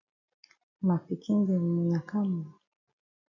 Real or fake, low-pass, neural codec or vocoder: real; 7.2 kHz; none